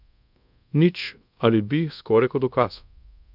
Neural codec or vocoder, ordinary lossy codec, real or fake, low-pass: codec, 24 kHz, 0.5 kbps, DualCodec; none; fake; 5.4 kHz